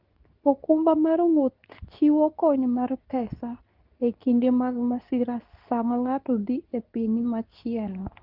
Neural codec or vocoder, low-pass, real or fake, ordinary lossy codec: codec, 24 kHz, 0.9 kbps, WavTokenizer, medium speech release version 2; 5.4 kHz; fake; Opus, 24 kbps